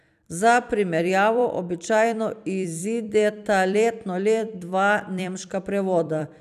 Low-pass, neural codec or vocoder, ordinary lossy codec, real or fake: 14.4 kHz; vocoder, 44.1 kHz, 128 mel bands every 256 samples, BigVGAN v2; none; fake